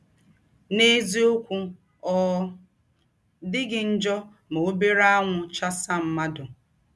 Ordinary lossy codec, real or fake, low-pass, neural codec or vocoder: none; real; none; none